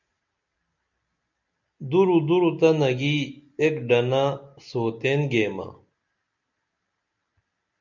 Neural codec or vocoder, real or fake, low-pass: none; real; 7.2 kHz